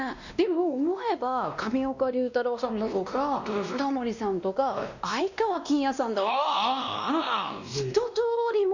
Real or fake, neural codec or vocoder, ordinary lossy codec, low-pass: fake; codec, 16 kHz, 1 kbps, X-Codec, WavLM features, trained on Multilingual LibriSpeech; none; 7.2 kHz